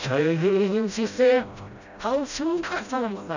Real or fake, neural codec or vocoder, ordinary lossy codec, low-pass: fake; codec, 16 kHz, 0.5 kbps, FreqCodec, smaller model; none; 7.2 kHz